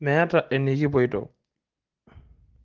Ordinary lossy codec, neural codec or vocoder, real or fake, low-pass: Opus, 16 kbps; none; real; 7.2 kHz